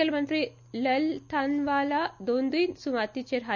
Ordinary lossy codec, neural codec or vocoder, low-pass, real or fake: none; none; 7.2 kHz; real